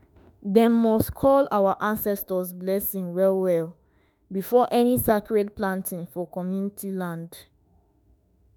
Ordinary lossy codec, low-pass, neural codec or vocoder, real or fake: none; none; autoencoder, 48 kHz, 32 numbers a frame, DAC-VAE, trained on Japanese speech; fake